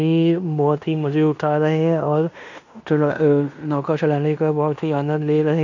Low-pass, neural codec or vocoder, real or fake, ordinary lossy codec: 7.2 kHz; codec, 16 kHz in and 24 kHz out, 0.9 kbps, LongCat-Audio-Codec, fine tuned four codebook decoder; fake; none